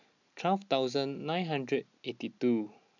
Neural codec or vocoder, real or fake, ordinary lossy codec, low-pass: none; real; none; 7.2 kHz